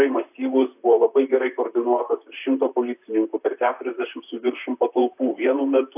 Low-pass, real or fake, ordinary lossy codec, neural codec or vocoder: 3.6 kHz; fake; AAC, 32 kbps; vocoder, 44.1 kHz, 128 mel bands, Pupu-Vocoder